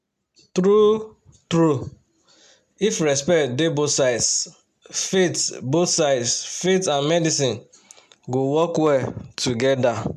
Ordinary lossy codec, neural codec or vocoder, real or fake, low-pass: MP3, 96 kbps; none; real; 14.4 kHz